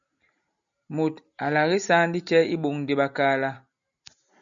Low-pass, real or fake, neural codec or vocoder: 7.2 kHz; real; none